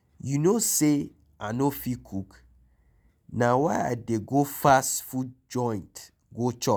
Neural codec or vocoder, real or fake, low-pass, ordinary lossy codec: none; real; none; none